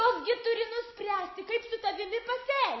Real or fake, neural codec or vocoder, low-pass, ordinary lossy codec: real; none; 7.2 kHz; MP3, 24 kbps